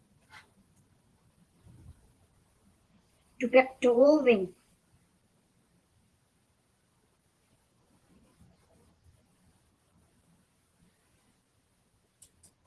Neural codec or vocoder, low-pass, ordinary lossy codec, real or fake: vocoder, 44.1 kHz, 128 mel bands every 512 samples, BigVGAN v2; 10.8 kHz; Opus, 16 kbps; fake